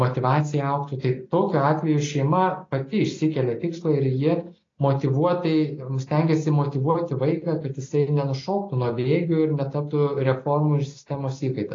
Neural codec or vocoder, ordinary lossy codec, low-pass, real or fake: none; AAC, 32 kbps; 7.2 kHz; real